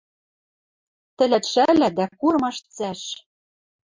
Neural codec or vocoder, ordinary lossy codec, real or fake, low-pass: none; MP3, 48 kbps; real; 7.2 kHz